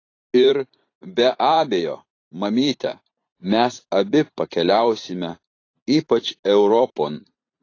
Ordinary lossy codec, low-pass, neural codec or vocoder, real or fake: AAC, 32 kbps; 7.2 kHz; vocoder, 44.1 kHz, 128 mel bands every 512 samples, BigVGAN v2; fake